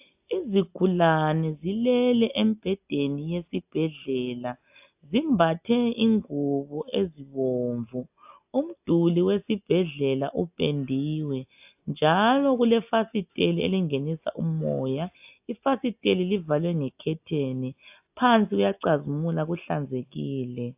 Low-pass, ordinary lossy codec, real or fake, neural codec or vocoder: 3.6 kHz; AAC, 32 kbps; real; none